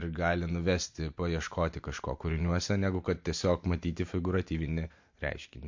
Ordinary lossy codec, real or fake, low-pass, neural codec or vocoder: MP3, 48 kbps; real; 7.2 kHz; none